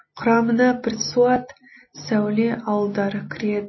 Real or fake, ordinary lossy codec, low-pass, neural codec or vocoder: real; MP3, 24 kbps; 7.2 kHz; none